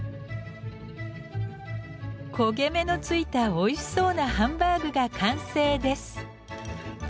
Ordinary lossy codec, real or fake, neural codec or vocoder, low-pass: none; real; none; none